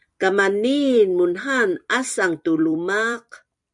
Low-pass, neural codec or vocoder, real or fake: 10.8 kHz; vocoder, 44.1 kHz, 128 mel bands every 256 samples, BigVGAN v2; fake